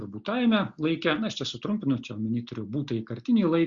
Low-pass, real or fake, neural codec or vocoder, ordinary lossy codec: 7.2 kHz; real; none; Opus, 64 kbps